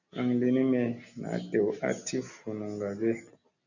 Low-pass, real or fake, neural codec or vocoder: 7.2 kHz; real; none